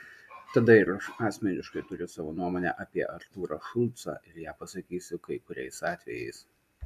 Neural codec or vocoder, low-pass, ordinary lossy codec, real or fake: none; 14.4 kHz; AAC, 96 kbps; real